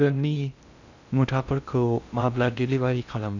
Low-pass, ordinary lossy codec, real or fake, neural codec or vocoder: 7.2 kHz; none; fake; codec, 16 kHz in and 24 kHz out, 0.6 kbps, FocalCodec, streaming, 2048 codes